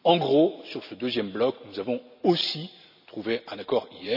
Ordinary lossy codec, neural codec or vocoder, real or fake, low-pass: none; none; real; 5.4 kHz